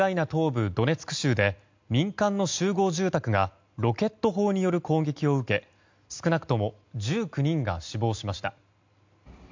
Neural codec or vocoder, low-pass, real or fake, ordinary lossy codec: none; 7.2 kHz; real; MP3, 64 kbps